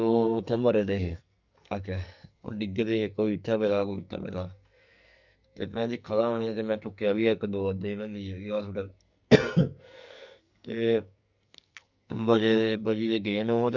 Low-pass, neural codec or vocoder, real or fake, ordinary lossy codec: 7.2 kHz; codec, 44.1 kHz, 2.6 kbps, SNAC; fake; none